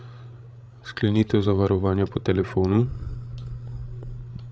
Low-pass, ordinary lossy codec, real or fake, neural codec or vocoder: none; none; fake; codec, 16 kHz, 16 kbps, FreqCodec, larger model